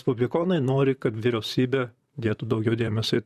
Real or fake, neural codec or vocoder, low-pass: fake; vocoder, 44.1 kHz, 128 mel bands, Pupu-Vocoder; 14.4 kHz